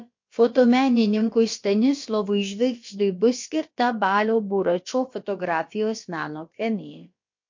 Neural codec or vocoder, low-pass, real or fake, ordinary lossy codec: codec, 16 kHz, about 1 kbps, DyCAST, with the encoder's durations; 7.2 kHz; fake; MP3, 48 kbps